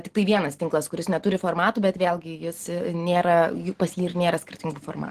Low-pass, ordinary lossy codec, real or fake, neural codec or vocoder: 14.4 kHz; Opus, 16 kbps; real; none